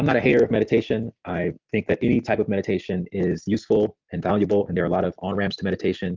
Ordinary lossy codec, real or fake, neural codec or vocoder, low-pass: Opus, 24 kbps; real; none; 7.2 kHz